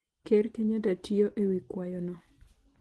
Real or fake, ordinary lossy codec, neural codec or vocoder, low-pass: real; Opus, 16 kbps; none; 10.8 kHz